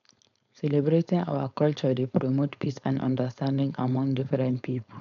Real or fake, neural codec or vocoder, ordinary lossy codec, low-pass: fake; codec, 16 kHz, 4.8 kbps, FACodec; MP3, 96 kbps; 7.2 kHz